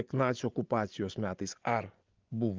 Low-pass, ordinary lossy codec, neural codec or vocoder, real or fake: 7.2 kHz; Opus, 32 kbps; vocoder, 24 kHz, 100 mel bands, Vocos; fake